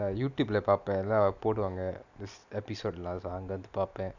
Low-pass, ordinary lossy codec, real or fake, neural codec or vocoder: 7.2 kHz; none; real; none